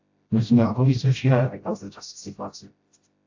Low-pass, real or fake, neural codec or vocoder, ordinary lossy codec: 7.2 kHz; fake; codec, 16 kHz, 0.5 kbps, FreqCodec, smaller model; AAC, 48 kbps